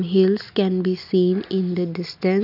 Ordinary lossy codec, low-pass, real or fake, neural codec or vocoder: none; 5.4 kHz; real; none